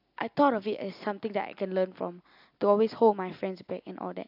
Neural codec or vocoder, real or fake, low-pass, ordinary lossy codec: none; real; 5.4 kHz; AAC, 48 kbps